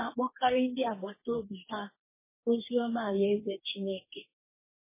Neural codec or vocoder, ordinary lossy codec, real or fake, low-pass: codec, 44.1 kHz, 2.6 kbps, DAC; MP3, 16 kbps; fake; 3.6 kHz